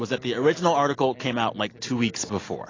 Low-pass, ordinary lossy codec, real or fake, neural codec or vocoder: 7.2 kHz; AAC, 32 kbps; real; none